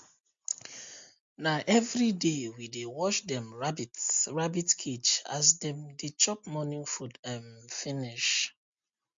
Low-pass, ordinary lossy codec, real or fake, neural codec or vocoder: 7.2 kHz; MP3, 48 kbps; real; none